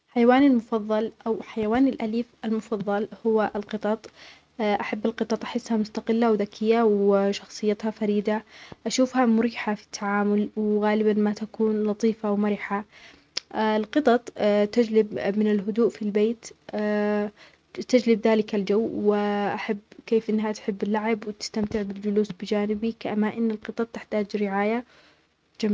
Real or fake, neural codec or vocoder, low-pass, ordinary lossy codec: real; none; none; none